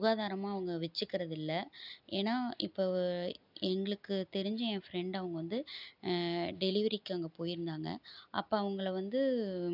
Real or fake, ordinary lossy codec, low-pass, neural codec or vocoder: real; none; 5.4 kHz; none